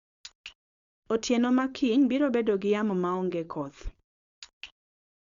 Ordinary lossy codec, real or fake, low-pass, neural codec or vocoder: Opus, 64 kbps; fake; 7.2 kHz; codec, 16 kHz, 4.8 kbps, FACodec